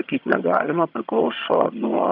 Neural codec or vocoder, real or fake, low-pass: vocoder, 22.05 kHz, 80 mel bands, HiFi-GAN; fake; 5.4 kHz